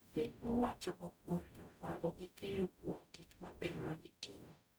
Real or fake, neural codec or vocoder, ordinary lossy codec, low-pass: fake; codec, 44.1 kHz, 0.9 kbps, DAC; none; none